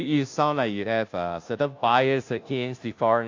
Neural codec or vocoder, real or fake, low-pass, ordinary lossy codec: codec, 16 kHz, 0.5 kbps, FunCodec, trained on Chinese and English, 25 frames a second; fake; 7.2 kHz; AAC, 48 kbps